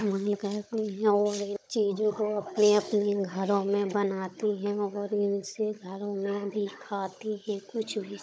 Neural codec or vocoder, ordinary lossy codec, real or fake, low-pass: codec, 16 kHz, 16 kbps, FunCodec, trained on Chinese and English, 50 frames a second; none; fake; none